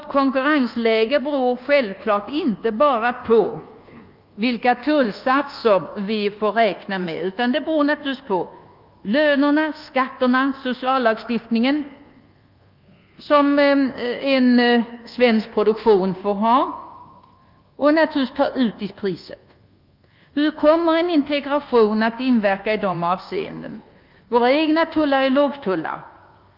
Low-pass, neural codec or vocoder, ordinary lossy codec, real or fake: 5.4 kHz; codec, 24 kHz, 1.2 kbps, DualCodec; Opus, 24 kbps; fake